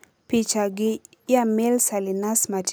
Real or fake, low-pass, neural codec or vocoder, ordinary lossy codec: real; none; none; none